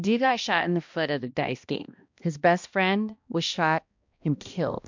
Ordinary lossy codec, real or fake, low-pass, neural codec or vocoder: MP3, 64 kbps; fake; 7.2 kHz; codec, 16 kHz, 1 kbps, X-Codec, HuBERT features, trained on balanced general audio